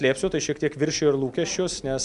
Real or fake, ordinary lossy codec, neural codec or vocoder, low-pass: real; MP3, 96 kbps; none; 10.8 kHz